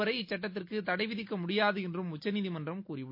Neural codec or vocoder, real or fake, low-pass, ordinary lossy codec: none; real; 5.4 kHz; MP3, 32 kbps